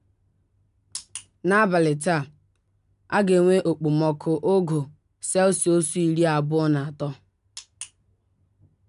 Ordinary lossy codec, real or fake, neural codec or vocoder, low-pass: none; real; none; 10.8 kHz